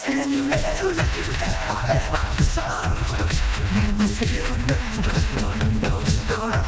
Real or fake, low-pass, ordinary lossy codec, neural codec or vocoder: fake; none; none; codec, 16 kHz, 1 kbps, FreqCodec, smaller model